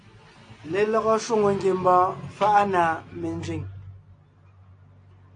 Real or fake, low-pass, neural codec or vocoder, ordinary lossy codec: real; 9.9 kHz; none; AAC, 48 kbps